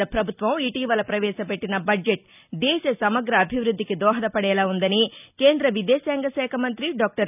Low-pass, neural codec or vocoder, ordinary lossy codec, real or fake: 3.6 kHz; none; none; real